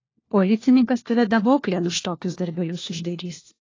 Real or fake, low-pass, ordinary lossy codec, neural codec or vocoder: fake; 7.2 kHz; AAC, 32 kbps; codec, 16 kHz, 1 kbps, FunCodec, trained on LibriTTS, 50 frames a second